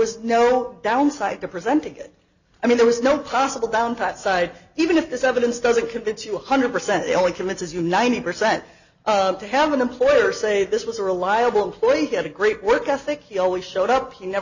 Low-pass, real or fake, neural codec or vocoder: 7.2 kHz; real; none